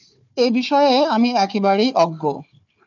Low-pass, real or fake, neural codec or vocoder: 7.2 kHz; fake; codec, 16 kHz, 4 kbps, FunCodec, trained on Chinese and English, 50 frames a second